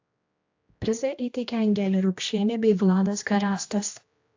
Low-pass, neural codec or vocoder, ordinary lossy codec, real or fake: 7.2 kHz; codec, 16 kHz, 1 kbps, X-Codec, HuBERT features, trained on general audio; AAC, 48 kbps; fake